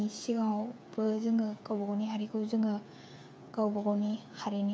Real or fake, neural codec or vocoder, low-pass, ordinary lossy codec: fake; codec, 16 kHz, 8 kbps, FreqCodec, smaller model; none; none